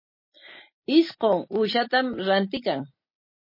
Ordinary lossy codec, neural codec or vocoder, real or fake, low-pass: MP3, 24 kbps; none; real; 5.4 kHz